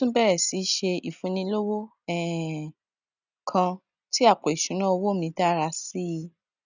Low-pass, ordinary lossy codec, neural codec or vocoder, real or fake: 7.2 kHz; none; none; real